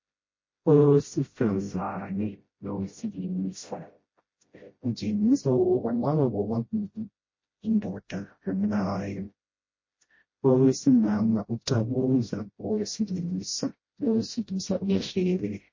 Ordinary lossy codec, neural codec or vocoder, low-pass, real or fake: MP3, 32 kbps; codec, 16 kHz, 0.5 kbps, FreqCodec, smaller model; 7.2 kHz; fake